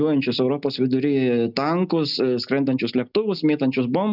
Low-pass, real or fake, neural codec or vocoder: 5.4 kHz; real; none